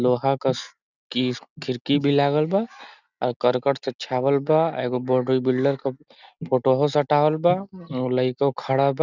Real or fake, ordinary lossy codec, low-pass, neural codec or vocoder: real; none; 7.2 kHz; none